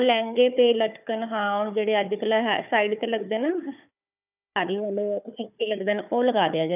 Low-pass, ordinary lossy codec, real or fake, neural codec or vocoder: 3.6 kHz; none; fake; codec, 16 kHz, 4 kbps, FunCodec, trained on Chinese and English, 50 frames a second